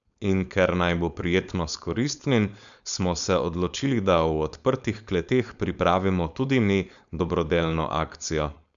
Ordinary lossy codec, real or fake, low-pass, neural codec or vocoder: none; fake; 7.2 kHz; codec, 16 kHz, 4.8 kbps, FACodec